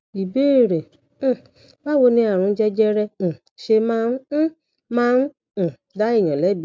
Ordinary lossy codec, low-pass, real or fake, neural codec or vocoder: none; none; real; none